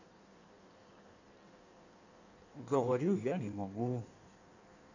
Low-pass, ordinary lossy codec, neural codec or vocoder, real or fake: 7.2 kHz; none; codec, 16 kHz in and 24 kHz out, 1.1 kbps, FireRedTTS-2 codec; fake